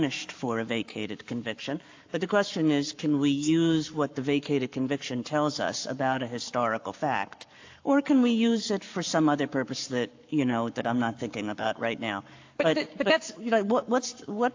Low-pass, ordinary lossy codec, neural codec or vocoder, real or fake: 7.2 kHz; AAC, 48 kbps; codec, 44.1 kHz, 7.8 kbps, Pupu-Codec; fake